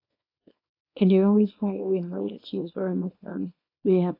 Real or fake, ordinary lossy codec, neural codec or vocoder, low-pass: fake; AAC, 32 kbps; codec, 24 kHz, 0.9 kbps, WavTokenizer, small release; 5.4 kHz